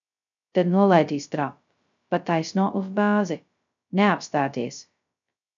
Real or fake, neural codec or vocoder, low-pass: fake; codec, 16 kHz, 0.2 kbps, FocalCodec; 7.2 kHz